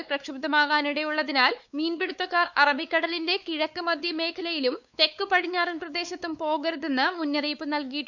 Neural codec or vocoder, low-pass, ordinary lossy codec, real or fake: codec, 16 kHz, 4 kbps, X-Codec, WavLM features, trained on Multilingual LibriSpeech; 7.2 kHz; none; fake